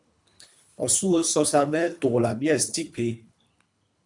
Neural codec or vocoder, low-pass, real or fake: codec, 24 kHz, 3 kbps, HILCodec; 10.8 kHz; fake